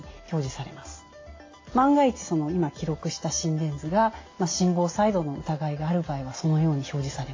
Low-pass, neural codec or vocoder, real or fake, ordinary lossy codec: 7.2 kHz; none; real; AAC, 32 kbps